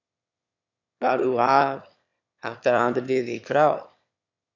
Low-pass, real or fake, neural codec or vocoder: 7.2 kHz; fake; autoencoder, 22.05 kHz, a latent of 192 numbers a frame, VITS, trained on one speaker